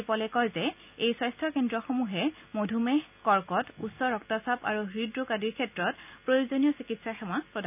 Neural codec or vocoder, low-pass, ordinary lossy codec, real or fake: none; 3.6 kHz; none; real